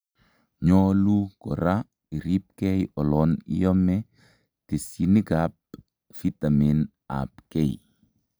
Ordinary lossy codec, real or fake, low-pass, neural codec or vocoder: none; real; none; none